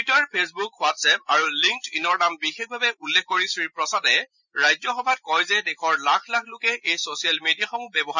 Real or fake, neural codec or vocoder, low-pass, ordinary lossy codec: real; none; 7.2 kHz; none